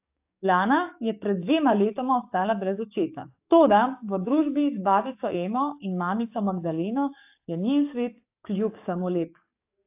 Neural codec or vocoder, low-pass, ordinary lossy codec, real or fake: codec, 44.1 kHz, 7.8 kbps, DAC; 3.6 kHz; AAC, 32 kbps; fake